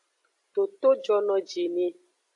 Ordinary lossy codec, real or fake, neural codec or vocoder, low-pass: AAC, 48 kbps; real; none; 10.8 kHz